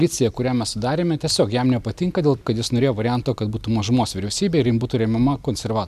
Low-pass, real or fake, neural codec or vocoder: 14.4 kHz; real; none